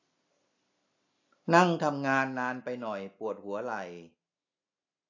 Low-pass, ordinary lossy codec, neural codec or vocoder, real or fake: 7.2 kHz; AAC, 48 kbps; none; real